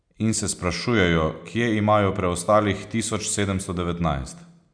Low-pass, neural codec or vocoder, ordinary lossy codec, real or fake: 9.9 kHz; none; none; real